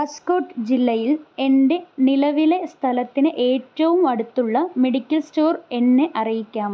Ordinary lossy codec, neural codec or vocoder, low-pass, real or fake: none; none; none; real